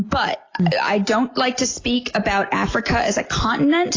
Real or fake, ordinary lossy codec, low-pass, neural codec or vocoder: real; AAC, 32 kbps; 7.2 kHz; none